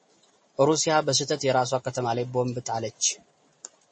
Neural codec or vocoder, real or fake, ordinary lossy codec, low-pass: none; real; MP3, 32 kbps; 10.8 kHz